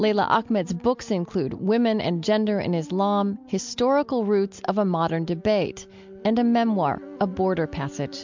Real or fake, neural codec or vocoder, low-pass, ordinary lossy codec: real; none; 7.2 kHz; MP3, 64 kbps